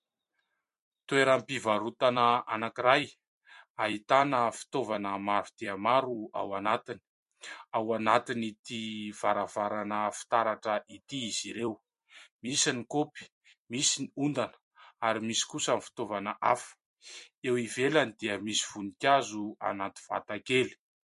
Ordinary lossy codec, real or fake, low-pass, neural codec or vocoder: MP3, 48 kbps; fake; 14.4 kHz; vocoder, 44.1 kHz, 128 mel bands every 256 samples, BigVGAN v2